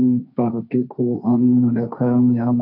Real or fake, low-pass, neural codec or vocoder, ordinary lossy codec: fake; 5.4 kHz; codec, 16 kHz, 1.1 kbps, Voila-Tokenizer; none